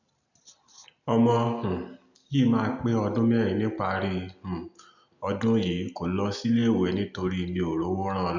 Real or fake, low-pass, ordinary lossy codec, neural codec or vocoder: real; 7.2 kHz; none; none